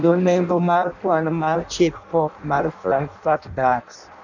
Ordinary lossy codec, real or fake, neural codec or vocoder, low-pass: none; fake; codec, 16 kHz in and 24 kHz out, 0.6 kbps, FireRedTTS-2 codec; 7.2 kHz